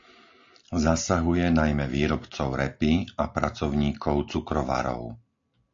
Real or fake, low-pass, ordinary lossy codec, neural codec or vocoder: real; 7.2 kHz; AAC, 48 kbps; none